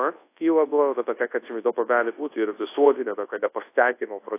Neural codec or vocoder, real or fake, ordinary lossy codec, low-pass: codec, 24 kHz, 0.9 kbps, WavTokenizer, large speech release; fake; AAC, 24 kbps; 3.6 kHz